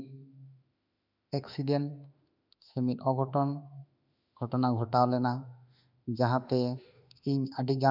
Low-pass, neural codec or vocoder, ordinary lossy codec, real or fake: 5.4 kHz; autoencoder, 48 kHz, 32 numbers a frame, DAC-VAE, trained on Japanese speech; none; fake